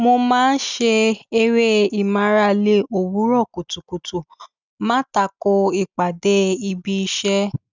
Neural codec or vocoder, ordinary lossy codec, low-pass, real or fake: none; none; 7.2 kHz; real